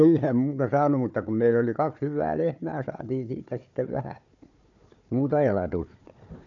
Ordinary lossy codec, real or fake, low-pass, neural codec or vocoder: none; fake; 7.2 kHz; codec, 16 kHz, 4 kbps, FunCodec, trained on Chinese and English, 50 frames a second